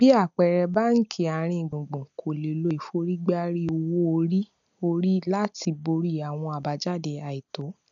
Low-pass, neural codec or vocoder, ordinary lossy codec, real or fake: 7.2 kHz; none; none; real